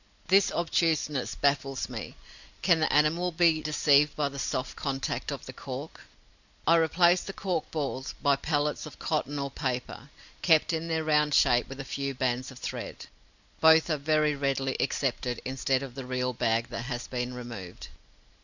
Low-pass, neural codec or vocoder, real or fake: 7.2 kHz; none; real